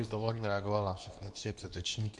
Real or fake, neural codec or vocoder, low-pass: fake; codec, 24 kHz, 0.9 kbps, WavTokenizer, medium speech release version 2; 10.8 kHz